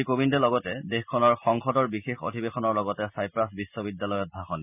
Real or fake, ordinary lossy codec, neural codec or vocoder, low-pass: real; none; none; 3.6 kHz